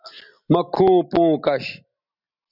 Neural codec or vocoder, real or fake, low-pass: none; real; 5.4 kHz